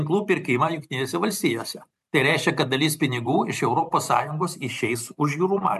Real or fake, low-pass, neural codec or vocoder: fake; 14.4 kHz; vocoder, 44.1 kHz, 128 mel bands every 512 samples, BigVGAN v2